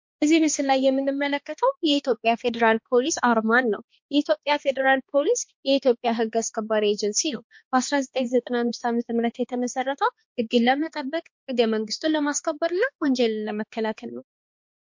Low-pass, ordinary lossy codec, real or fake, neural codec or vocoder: 7.2 kHz; MP3, 48 kbps; fake; codec, 16 kHz, 2 kbps, X-Codec, HuBERT features, trained on balanced general audio